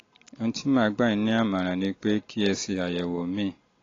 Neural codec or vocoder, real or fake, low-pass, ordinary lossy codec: none; real; 7.2 kHz; AAC, 32 kbps